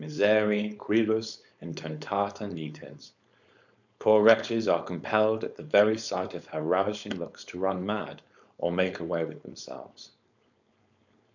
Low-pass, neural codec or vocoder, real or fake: 7.2 kHz; codec, 16 kHz, 4.8 kbps, FACodec; fake